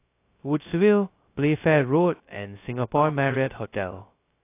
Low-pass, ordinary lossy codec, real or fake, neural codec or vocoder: 3.6 kHz; AAC, 24 kbps; fake; codec, 16 kHz, 0.2 kbps, FocalCodec